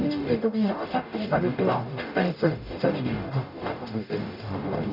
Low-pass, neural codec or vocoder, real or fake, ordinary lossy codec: 5.4 kHz; codec, 44.1 kHz, 0.9 kbps, DAC; fake; none